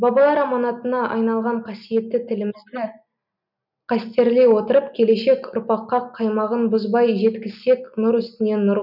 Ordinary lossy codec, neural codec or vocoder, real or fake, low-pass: none; none; real; 5.4 kHz